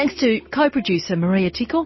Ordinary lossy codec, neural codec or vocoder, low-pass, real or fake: MP3, 24 kbps; none; 7.2 kHz; real